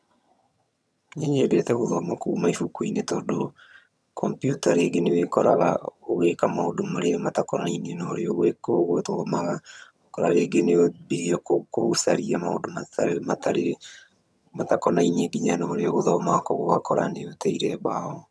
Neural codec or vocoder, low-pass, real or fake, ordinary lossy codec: vocoder, 22.05 kHz, 80 mel bands, HiFi-GAN; none; fake; none